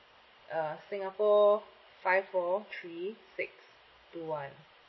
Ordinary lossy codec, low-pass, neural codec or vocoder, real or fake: MP3, 24 kbps; 7.2 kHz; none; real